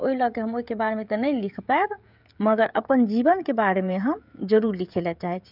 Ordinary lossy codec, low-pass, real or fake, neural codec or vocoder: none; 5.4 kHz; fake; codec, 16 kHz, 16 kbps, FreqCodec, smaller model